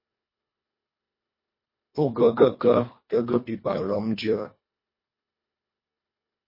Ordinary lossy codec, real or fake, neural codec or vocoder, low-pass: MP3, 24 kbps; fake; codec, 24 kHz, 1.5 kbps, HILCodec; 5.4 kHz